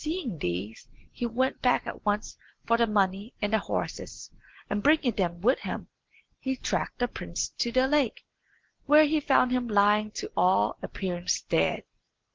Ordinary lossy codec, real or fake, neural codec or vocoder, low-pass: Opus, 16 kbps; real; none; 7.2 kHz